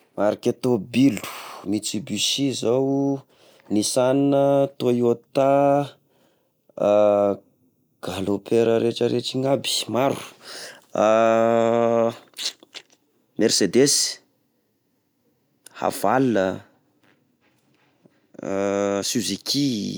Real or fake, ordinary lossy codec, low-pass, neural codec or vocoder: real; none; none; none